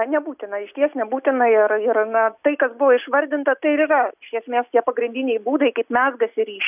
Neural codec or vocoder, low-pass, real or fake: none; 3.6 kHz; real